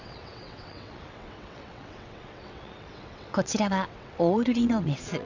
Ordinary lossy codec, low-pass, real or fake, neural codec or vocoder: none; 7.2 kHz; fake; vocoder, 22.05 kHz, 80 mel bands, WaveNeXt